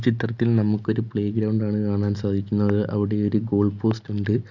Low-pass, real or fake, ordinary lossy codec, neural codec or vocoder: 7.2 kHz; real; none; none